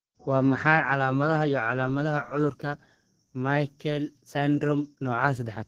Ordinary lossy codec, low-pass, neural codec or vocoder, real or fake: Opus, 16 kbps; 14.4 kHz; codec, 32 kHz, 1.9 kbps, SNAC; fake